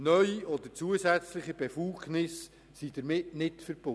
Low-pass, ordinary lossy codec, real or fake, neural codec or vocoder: none; none; real; none